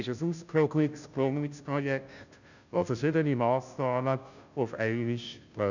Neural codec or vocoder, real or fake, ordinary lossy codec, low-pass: codec, 16 kHz, 0.5 kbps, FunCodec, trained on Chinese and English, 25 frames a second; fake; none; 7.2 kHz